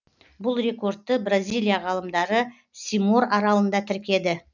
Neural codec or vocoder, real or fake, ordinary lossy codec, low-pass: none; real; none; 7.2 kHz